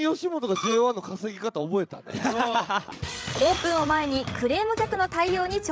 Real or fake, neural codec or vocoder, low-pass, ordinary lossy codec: fake; codec, 16 kHz, 8 kbps, FreqCodec, larger model; none; none